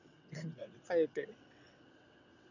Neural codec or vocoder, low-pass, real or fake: vocoder, 22.05 kHz, 80 mel bands, WaveNeXt; 7.2 kHz; fake